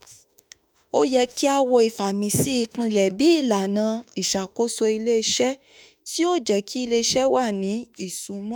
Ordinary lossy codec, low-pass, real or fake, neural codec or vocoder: none; none; fake; autoencoder, 48 kHz, 32 numbers a frame, DAC-VAE, trained on Japanese speech